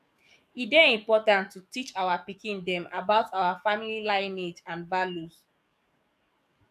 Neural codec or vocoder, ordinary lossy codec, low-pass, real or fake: codec, 44.1 kHz, 7.8 kbps, DAC; none; 14.4 kHz; fake